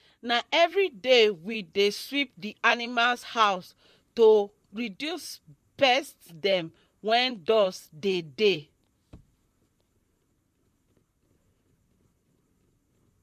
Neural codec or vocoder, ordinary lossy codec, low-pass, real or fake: vocoder, 44.1 kHz, 128 mel bands, Pupu-Vocoder; MP3, 64 kbps; 14.4 kHz; fake